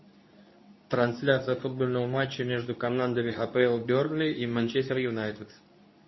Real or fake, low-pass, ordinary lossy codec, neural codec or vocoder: fake; 7.2 kHz; MP3, 24 kbps; codec, 24 kHz, 0.9 kbps, WavTokenizer, medium speech release version 2